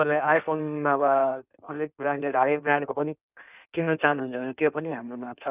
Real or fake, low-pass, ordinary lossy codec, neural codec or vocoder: fake; 3.6 kHz; none; codec, 16 kHz in and 24 kHz out, 1.1 kbps, FireRedTTS-2 codec